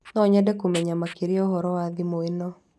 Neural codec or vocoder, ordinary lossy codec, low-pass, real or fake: none; none; none; real